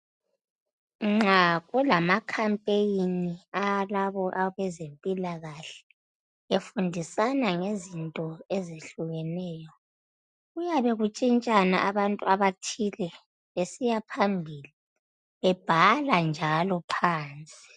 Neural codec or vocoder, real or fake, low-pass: none; real; 10.8 kHz